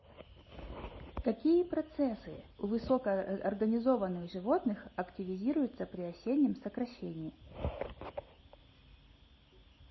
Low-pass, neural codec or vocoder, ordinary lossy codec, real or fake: 7.2 kHz; none; MP3, 24 kbps; real